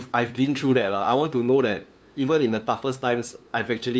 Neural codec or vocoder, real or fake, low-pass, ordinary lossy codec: codec, 16 kHz, 2 kbps, FunCodec, trained on LibriTTS, 25 frames a second; fake; none; none